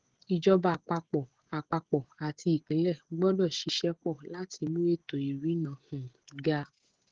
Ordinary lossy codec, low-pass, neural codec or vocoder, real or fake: Opus, 16 kbps; 7.2 kHz; codec, 16 kHz, 16 kbps, FreqCodec, smaller model; fake